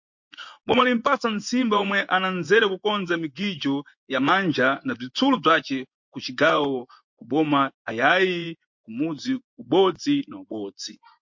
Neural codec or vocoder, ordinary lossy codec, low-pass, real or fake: vocoder, 24 kHz, 100 mel bands, Vocos; MP3, 48 kbps; 7.2 kHz; fake